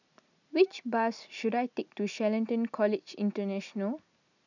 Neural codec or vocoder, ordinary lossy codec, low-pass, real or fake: none; none; 7.2 kHz; real